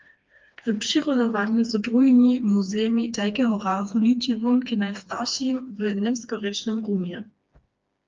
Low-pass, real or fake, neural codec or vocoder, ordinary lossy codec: 7.2 kHz; fake; codec, 16 kHz, 2 kbps, FreqCodec, smaller model; Opus, 24 kbps